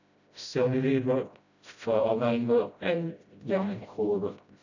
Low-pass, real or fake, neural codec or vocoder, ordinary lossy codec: 7.2 kHz; fake; codec, 16 kHz, 0.5 kbps, FreqCodec, smaller model; none